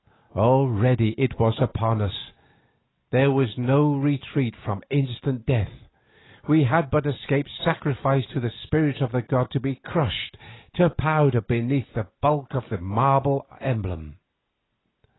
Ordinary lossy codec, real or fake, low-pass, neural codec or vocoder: AAC, 16 kbps; real; 7.2 kHz; none